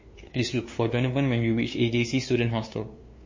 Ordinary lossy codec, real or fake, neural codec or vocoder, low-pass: MP3, 32 kbps; fake; codec, 16 kHz, 2 kbps, FunCodec, trained on LibriTTS, 25 frames a second; 7.2 kHz